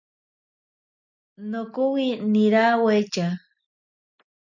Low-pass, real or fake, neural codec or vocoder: 7.2 kHz; real; none